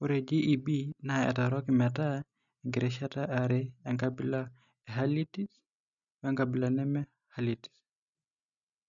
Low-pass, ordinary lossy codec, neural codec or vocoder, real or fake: 7.2 kHz; none; none; real